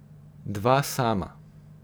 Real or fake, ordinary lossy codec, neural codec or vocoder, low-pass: fake; none; vocoder, 44.1 kHz, 128 mel bands every 512 samples, BigVGAN v2; none